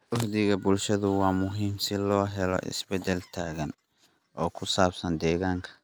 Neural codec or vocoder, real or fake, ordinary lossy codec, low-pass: none; real; none; none